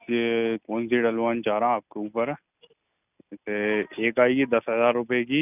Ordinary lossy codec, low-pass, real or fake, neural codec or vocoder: none; 3.6 kHz; real; none